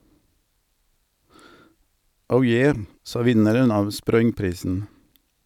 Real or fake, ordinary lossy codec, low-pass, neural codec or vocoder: real; none; 19.8 kHz; none